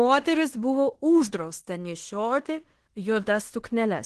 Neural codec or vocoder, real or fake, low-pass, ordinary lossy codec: codec, 16 kHz in and 24 kHz out, 0.9 kbps, LongCat-Audio-Codec, fine tuned four codebook decoder; fake; 10.8 kHz; Opus, 16 kbps